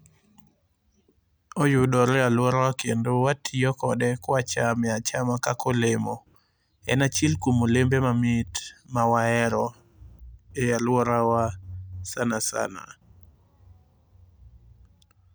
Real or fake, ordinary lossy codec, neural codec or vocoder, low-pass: real; none; none; none